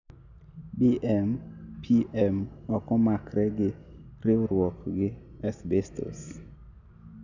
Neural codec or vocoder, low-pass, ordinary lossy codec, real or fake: none; 7.2 kHz; none; real